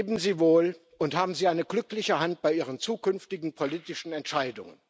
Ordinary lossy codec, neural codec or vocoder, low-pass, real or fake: none; none; none; real